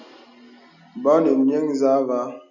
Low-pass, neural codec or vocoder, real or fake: 7.2 kHz; none; real